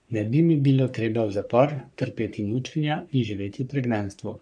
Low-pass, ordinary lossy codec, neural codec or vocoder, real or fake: 9.9 kHz; none; codec, 44.1 kHz, 3.4 kbps, Pupu-Codec; fake